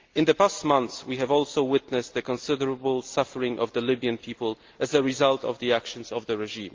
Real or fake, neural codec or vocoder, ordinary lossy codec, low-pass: real; none; Opus, 32 kbps; 7.2 kHz